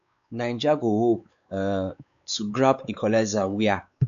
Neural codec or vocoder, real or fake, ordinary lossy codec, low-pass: codec, 16 kHz, 4 kbps, X-Codec, WavLM features, trained on Multilingual LibriSpeech; fake; none; 7.2 kHz